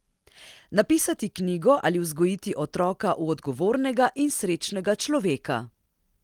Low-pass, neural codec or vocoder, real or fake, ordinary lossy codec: 19.8 kHz; none; real; Opus, 24 kbps